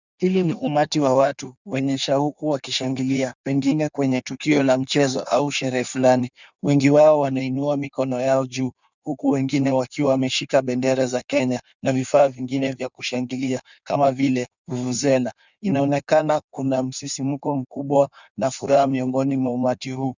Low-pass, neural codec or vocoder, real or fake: 7.2 kHz; codec, 16 kHz in and 24 kHz out, 1.1 kbps, FireRedTTS-2 codec; fake